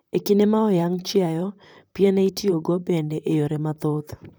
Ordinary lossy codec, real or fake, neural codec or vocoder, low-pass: none; fake; vocoder, 44.1 kHz, 128 mel bands, Pupu-Vocoder; none